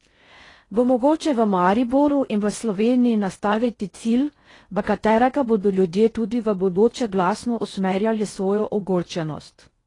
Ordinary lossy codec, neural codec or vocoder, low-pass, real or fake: AAC, 32 kbps; codec, 16 kHz in and 24 kHz out, 0.6 kbps, FocalCodec, streaming, 4096 codes; 10.8 kHz; fake